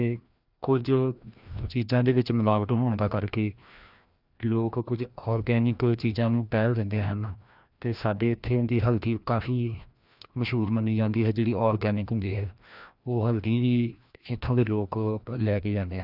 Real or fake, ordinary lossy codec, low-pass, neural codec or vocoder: fake; none; 5.4 kHz; codec, 16 kHz, 1 kbps, FreqCodec, larger model